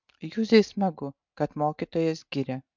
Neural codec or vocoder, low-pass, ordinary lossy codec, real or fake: none; 7.2 kHz; MP3, 64 kbps; real